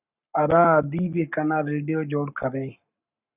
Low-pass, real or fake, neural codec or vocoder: 3.6 kHz; fake; codec, 44.1 kHz, 7.8 kbps, Pupu-Codec